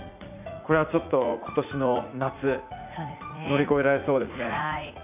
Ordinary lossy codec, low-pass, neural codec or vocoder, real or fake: none; 3.6 kHz; vocoder, 44.1 kHz, 80 mel bands, Vocos; fake